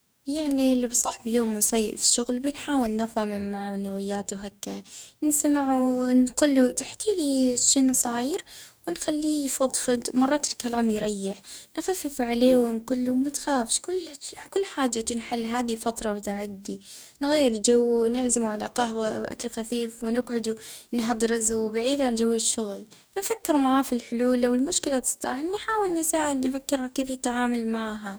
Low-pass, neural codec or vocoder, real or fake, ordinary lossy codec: none; codec, 44.1 kHz, 2.6 kbps, DAC; fake; none